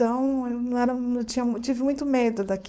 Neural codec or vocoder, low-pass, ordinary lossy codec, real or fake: codec, 16 kHz, 4.8 kbps, FACodec; none; none; fake